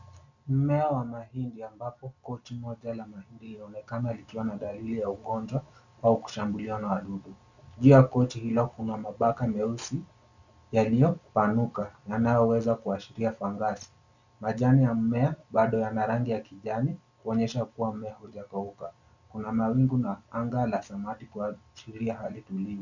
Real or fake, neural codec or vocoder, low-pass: real; none; 7.2 kHz